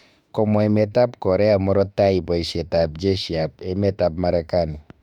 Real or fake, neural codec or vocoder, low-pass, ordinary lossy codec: fake; autoencoder, 48 kHz, 32 numbers a frame, DAC-VAE, trained on Japanese speech; 19.8 kHz; none